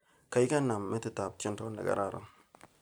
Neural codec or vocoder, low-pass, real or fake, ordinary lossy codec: none; none; real; none